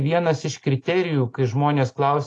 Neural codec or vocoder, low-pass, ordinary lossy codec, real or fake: none; 10.8 kHz; AAC, 48 kbps; real